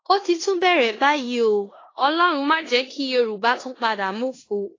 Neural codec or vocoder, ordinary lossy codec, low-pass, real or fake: codec, 16 kHz in and 24 kHz out, 0.9 kbps, LongCat-Audio-Codec, four codebook decoder; AAC, 32 kbps; 7.2 kHz; fake